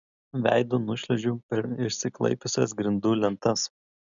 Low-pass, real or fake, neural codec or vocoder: 7.2 kHz; real; none